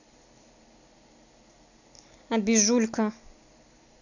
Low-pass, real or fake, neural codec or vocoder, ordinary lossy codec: 7.2 kHz; real; none; none